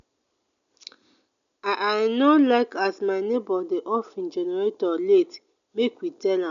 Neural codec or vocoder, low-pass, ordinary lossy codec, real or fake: none; 7.2 kHz; MP3, 96 kbps; real